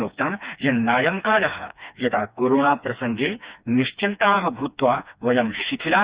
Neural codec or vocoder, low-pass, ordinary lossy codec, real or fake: codec, 16 kHz, 2 kbps, FreqCodec, smaller model; 3.6 kHz; Opus, 64 kbps; fake